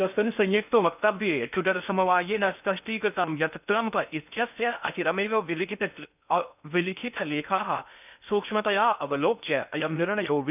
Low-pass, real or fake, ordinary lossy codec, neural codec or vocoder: 3.6 kHz; fake; none; codec, 16 kHz in and 24 kHz out, 0.6 kbps, FocalCodec, streaming, 2048 codes